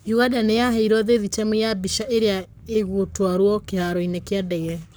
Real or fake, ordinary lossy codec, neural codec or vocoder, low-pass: fake; none; codec, 44.1 kHz, 7.8 kbps, Pupu-Codec; none